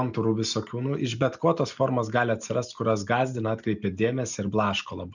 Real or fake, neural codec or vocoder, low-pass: real; none; 7.2 kHz